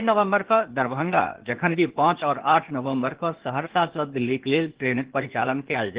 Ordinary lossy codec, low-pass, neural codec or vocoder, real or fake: Opus, 16 kbps; 3.6 kHz; codec, 16 kHz, 0.8 kbps, ZipCodec; fake